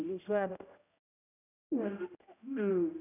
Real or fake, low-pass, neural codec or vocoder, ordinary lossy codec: fake; 3.6 kHz; codec, 16 kHz, 0.5 kbps, X-Codec, HuBERT features, trained on general audio; none